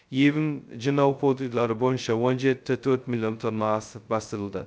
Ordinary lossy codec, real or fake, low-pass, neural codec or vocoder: none; fake; none; codec, 16 kHz, 0.2 kbps, FocalCodec